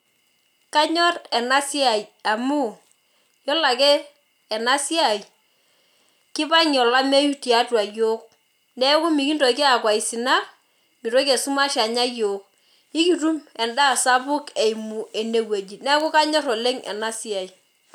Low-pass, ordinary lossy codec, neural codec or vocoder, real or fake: 19.8 kHz; none; none; real